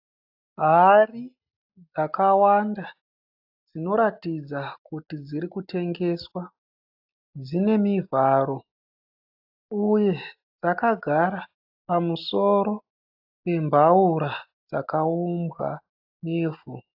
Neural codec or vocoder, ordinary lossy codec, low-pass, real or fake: none; AAC, 48 kbps; 5.4 kHz; real